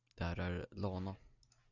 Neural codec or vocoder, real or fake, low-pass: none; real; 7.2 kHz